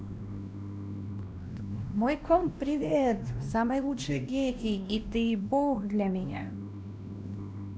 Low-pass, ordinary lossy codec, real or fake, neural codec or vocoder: none; none; fake; codec, 16 kHz, 1 kbps, X-Codec, WavLM features, trained on Multilingual LibriSpeech